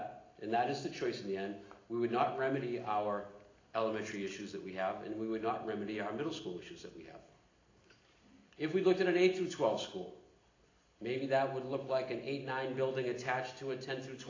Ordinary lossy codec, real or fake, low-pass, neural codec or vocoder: AAC, 32 kbps; real; 7.2 kHz; none